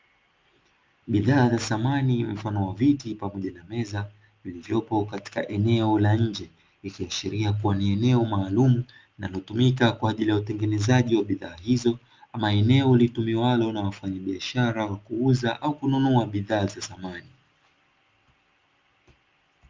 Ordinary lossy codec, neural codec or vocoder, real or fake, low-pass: Opus, 24 kbps; none; real; 7.2 kHz